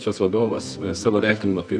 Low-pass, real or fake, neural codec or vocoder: 9.9 kHz; fake; codec, 24 kHz, 0.9 kbps, WavTokenizer, medium music audio release